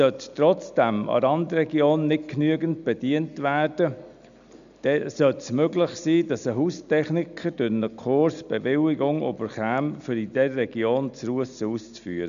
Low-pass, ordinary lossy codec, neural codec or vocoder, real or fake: 7.2 kHz; none; none; real